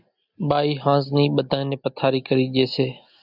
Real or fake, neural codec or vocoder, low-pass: real; none; 5.4 kHz